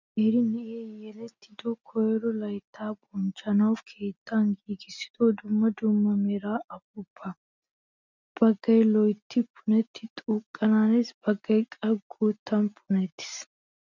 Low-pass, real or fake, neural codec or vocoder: 7.2 kHz; real; none